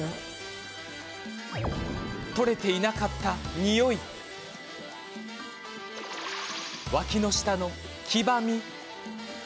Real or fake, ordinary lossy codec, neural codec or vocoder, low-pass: real; none; none; none